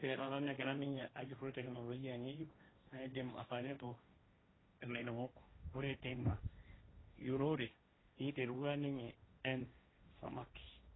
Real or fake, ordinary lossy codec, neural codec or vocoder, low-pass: fake; AAC, 16 kbps; codec, 16 kHz, 1.1 kbps, Voila-Tokenizer; 7.2 kHz